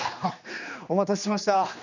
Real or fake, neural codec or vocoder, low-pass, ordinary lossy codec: fake; codec, 16 kHz, 2 kbps, X-Codec, HuBERT features, trained on general audio; 7.2 kHz; none